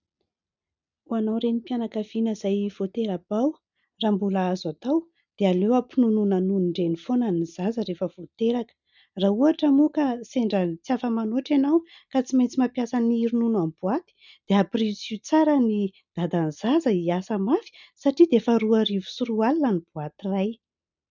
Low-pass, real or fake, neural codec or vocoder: 7.2 kHz; real; none